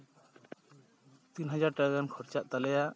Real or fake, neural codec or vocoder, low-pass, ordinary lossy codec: real; none; none; none